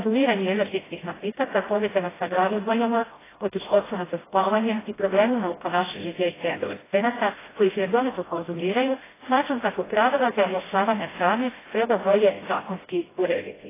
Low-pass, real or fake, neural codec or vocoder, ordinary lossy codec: 3.6 kHz; fake; codec, 16 kHz, 0.5 kbps, FreqCodec, smaller model; AAC, 16 kbps